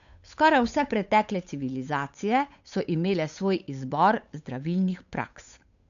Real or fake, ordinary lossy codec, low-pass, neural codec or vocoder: fake; none; 7.2 kHz; codec, 16 kHz, 8 kbps, FunCodec, trained on Chinese and English, 25 frames a second